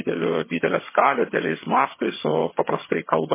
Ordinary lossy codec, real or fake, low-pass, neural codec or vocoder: MP3, 16 kbps; fake; 3.6 kHz; vocoder, 22.05 kHz, 80 mel bands, HiFi-GAN